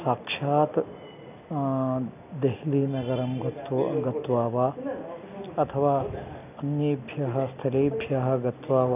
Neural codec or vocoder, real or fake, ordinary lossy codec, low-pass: none; real; none; 3.6 kHz